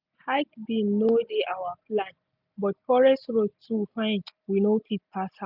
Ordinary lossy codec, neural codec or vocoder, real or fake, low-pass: none; none; real; 5.4 kHz